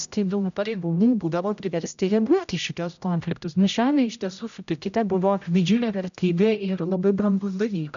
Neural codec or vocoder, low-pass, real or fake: codec, 16 kHz, 0.5 kbps, X-Codec, HuBERT features, trained on general audio; 7.2 kHz; fake